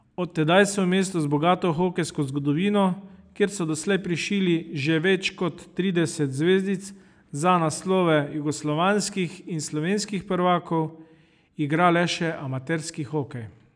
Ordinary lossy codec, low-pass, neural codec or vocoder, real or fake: none; 9.9 kHz; none; real